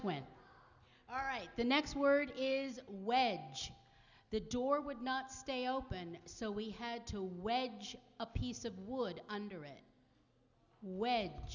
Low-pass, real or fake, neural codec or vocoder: 7.2 kHz; real; none